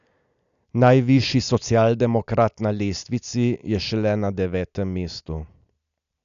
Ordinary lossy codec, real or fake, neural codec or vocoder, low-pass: none; real; none; 7.2 kHz